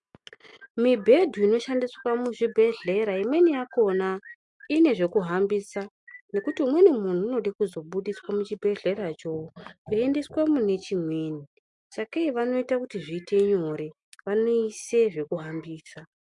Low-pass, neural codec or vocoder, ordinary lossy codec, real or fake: 10.8 kHz; none; MP3, 64 kbps; real